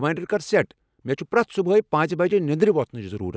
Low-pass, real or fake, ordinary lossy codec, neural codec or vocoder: none; real; none; none